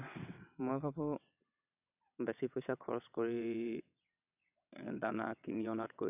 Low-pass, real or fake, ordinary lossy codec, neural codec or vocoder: 3.6 kHz; fake; none; vocoder, 22.05 kHz, 80 mel bands, WaveNeXt